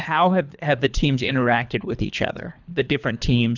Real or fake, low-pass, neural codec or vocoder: fake; 7.2 kHz; codec, 24 kHz, 3 kbps, HILCodec